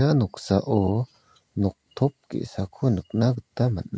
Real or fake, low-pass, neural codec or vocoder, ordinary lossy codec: real; none; none; none